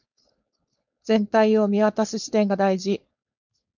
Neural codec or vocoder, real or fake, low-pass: codec, 16 kHz, 4.8 kbps, FACodec; fake; 7.2 kHz